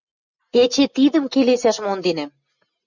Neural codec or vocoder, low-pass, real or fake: none; 7.2 kHz; real